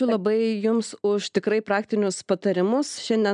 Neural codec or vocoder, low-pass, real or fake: none; 9.9 kHz; real